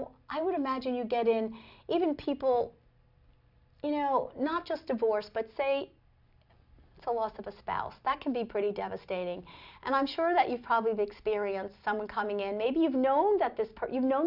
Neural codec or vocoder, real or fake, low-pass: none; real; 5.4 kHz